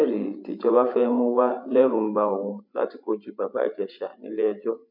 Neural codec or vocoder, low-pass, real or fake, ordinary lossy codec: codec, 16 kHz, 8 kbps, FreqCodec, larger model; 5.4 kHz; fake; none